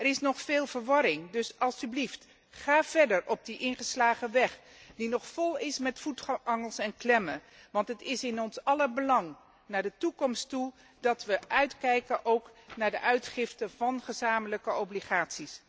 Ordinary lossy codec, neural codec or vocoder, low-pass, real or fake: none; none; none; real